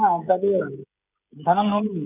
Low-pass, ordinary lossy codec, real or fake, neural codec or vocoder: 3.6 kHz; none; fake; codec, 16 kHz, 16 kbps, FreqCodec, smaller model